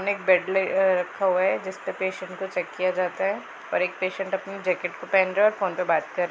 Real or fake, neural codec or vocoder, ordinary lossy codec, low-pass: real; none; none; none